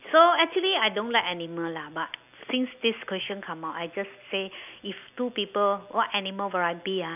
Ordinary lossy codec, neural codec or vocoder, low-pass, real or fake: none; none; 3.6 kHz; real